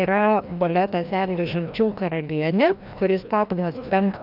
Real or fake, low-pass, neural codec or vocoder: fake; 5.4 kHz; codec, 16 kHz, 1 kbps, FreqCodec, larger model